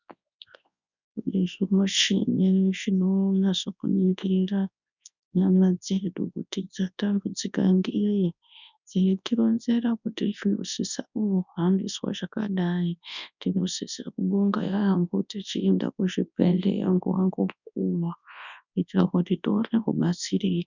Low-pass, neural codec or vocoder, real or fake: 7.2 kHz; codec, 24 kHz, 0.9 kbps, WavTokenizer, large speech release; fake